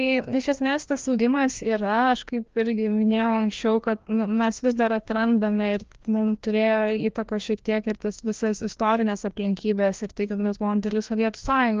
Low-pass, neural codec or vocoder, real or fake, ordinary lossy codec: 7.2 kHz; codec, 16 kHz, 1 kbps, FreqCodec, larger model; fake; Opus, 32 kbps